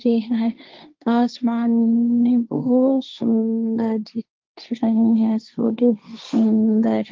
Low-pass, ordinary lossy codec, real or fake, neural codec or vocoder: 7.2 kHz; Opus, 32 kbps; fake; codec, 16 kHz, 1.1 kbps, Voila-Tokenizer